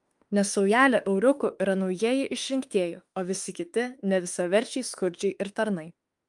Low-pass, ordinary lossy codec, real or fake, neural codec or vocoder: 10.8 kHz; Opus, 32 kbps; fake; autoencoder, 48 kHz, 32 numbers a frame, DAC-VAE, trained on Japanese speech